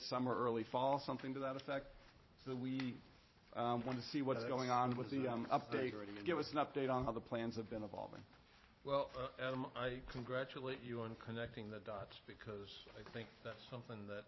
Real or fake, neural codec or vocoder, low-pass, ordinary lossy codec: fake; vocoder, 44.1 kHz, 128 mel bands every 512 samples, BigVGAN v2; 7.2 kHz; MP3, 24 kbps